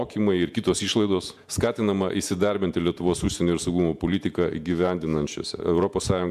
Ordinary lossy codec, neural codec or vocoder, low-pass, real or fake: MP3, 96 kbps; vocoder, 48 kHz, 128 mel bands, Vocos; 14.4 kHz; fake